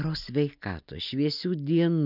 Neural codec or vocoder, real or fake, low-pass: none; real; 5.4 kHz